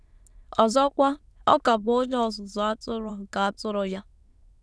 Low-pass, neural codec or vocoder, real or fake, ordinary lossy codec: none; autoencoder, 22.05 kHz, a latent of 192 numbers a frame, VITS, trained on many speakers; fake; none